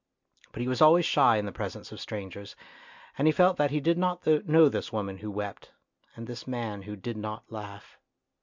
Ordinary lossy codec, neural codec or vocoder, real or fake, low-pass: MP3, 64 kbps; none; real; 7.2 kHz